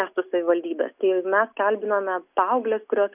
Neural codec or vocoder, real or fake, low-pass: none; real; 3.6 kHz